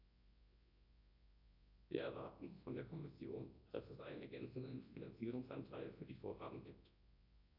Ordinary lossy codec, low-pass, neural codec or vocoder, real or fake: AAC, 48 kbps; 5.4 kHz; codec, 24 kHz, 0.9 kbps, WavTokenizer, large speech release; fake